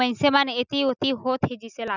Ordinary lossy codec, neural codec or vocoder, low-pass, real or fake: none; none; 7.2 kHz; real